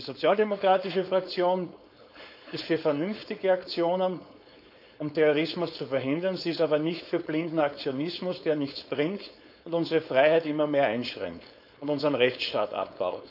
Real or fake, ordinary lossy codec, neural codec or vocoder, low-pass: fake; none; codec, 16 kHz, 4.8 kbps, FACodec; 5.4 kHz